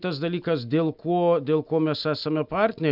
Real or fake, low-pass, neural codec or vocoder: real; 5.4 kHz; none